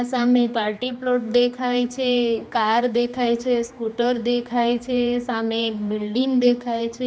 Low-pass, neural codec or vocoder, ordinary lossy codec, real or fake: none; codec, 16 kHz, 2 kbps, X-Codec, HuBERT features, trained on general audio; none; fake